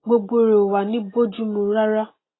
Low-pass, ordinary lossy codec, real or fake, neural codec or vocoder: 7.2 kHz; AAC, 16 kbps; real; none